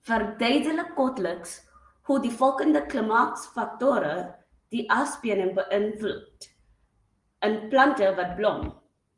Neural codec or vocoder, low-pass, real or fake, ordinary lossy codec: none; 10.8 kHz; real; Opus, 24 kbps